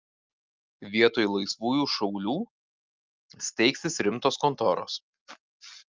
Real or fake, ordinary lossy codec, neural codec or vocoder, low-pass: real; Opus, 24 kbps; none; 7.2 kHz